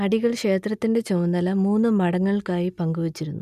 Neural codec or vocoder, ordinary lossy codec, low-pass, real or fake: none; none; 14.4 kHz; real